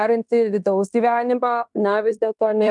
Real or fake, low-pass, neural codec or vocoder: fake; 10.8 kHz; codec, 16 kHz in and 24 kHz out, 0.9 kbps, LongCat-Audio-Codec, fine tuned four codebook decoder